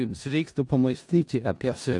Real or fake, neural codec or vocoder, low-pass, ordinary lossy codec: fake; codec, 16 kHz in and 24 kHz out, 0.4 kbps, LongCat-Audio-Codec, four codebook decoder; 10.8 kHz; AAC, 64 kbps